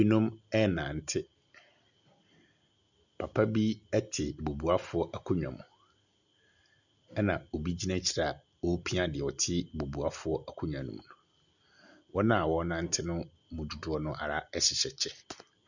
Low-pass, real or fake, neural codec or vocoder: 7.2 kHz; real; none